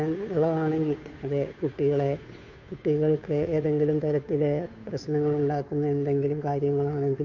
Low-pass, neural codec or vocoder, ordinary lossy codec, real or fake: 7.2 kHz; codec, 16 kHz, 2 kbps, FunCodec, trained on Chinese and English, 25 frames a second; none; fake